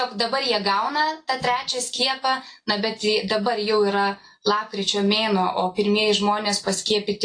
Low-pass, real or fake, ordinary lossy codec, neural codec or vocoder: 9.9 kHz; real; AAC, 48 kbps; none